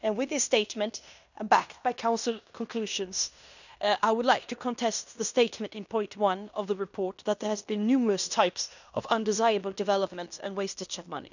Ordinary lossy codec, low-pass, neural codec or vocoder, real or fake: none; 7.2 kHz; codec, 16 kHz in and 24 kHz out, 0.9 kbps, LongCat-Audio-Codec, fine tuned four codebook decoder; fake